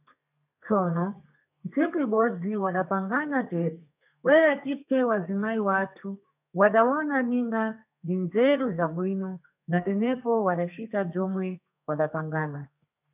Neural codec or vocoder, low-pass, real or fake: codec, 32 kHz, 1.9 kbps, SNAC; 3.6 kHz; fake